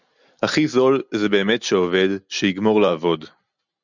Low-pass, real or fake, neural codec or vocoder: 7.2 kHz; real; none